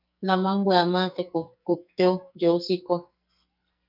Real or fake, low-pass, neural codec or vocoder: fake; 5.4 kHz; codec, 44.1 kHz, 2.6 kbps, SNAC